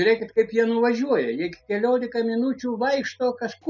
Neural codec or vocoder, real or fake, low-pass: none; real; 7.2 kHz